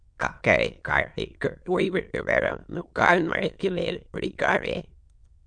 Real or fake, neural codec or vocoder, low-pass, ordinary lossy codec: fake; autoencoder, 22.05 kHz, a latent of 192 numbers a frame, VITS, trained on many speakers; 9.9 kHz; MP3, 64 kbps